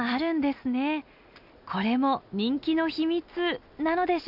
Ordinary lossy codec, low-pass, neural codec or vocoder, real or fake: none; 5.4 kHz; none; real